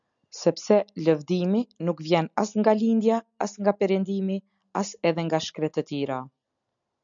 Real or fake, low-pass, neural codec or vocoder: real; 7.2 kHz; none